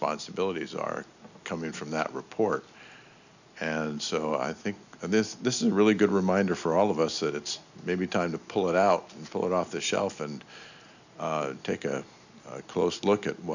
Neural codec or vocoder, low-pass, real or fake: none; 7.2 kHz; real